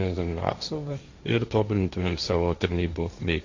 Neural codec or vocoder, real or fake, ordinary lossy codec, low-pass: codec, 16 kHz, 1.1 kbps, Voila-Tokenizer; fake; AAC, 48 kbps; 7.2 kHz